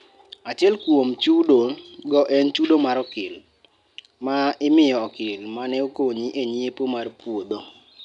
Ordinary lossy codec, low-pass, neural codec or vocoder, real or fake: none; 10.8 kHz; none; real